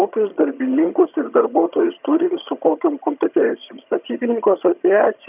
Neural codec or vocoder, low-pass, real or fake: vocoder, 22.05 kHz, 80 mel bands, HiFi-GAN; 3.6 kHz; fake